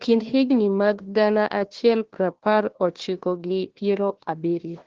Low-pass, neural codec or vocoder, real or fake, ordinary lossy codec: 7.2 kHz; codec, 16 kHz, 1 kbps, FunCodec, trained on Chinese and English, 50 frames a second; fake; Opus, 16 kbps